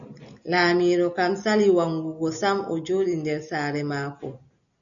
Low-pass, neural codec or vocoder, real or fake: 7.2 kHz; none; real